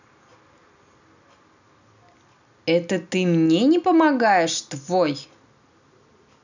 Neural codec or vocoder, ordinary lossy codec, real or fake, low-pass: none; none; real; 7.2 kHz